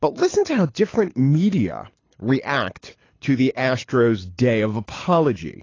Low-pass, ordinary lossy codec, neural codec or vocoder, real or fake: 7.2 kHz; AAC, 32 kbps; codec, 24 kHz, 6 kbps, HILCodec; fake